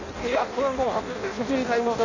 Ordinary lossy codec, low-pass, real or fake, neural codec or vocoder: none; 7.2 kHz; fake; codec, 16 kHz in and 24 kHz out, 0.6 kbps, FireRedTTS-2 codec